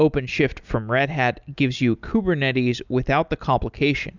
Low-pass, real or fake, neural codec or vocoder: 7.2 kHz; real; none